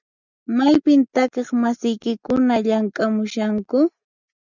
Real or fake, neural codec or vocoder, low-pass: real; none; 7.2 kHz